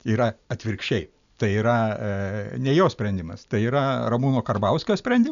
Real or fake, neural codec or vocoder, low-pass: real; none; 7.2 kHz